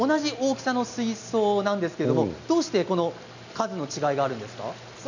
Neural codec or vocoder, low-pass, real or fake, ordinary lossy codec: none; 7.2 kHz; real; none